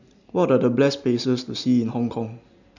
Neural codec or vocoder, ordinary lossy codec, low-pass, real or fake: none; none; 7.2 kHz; real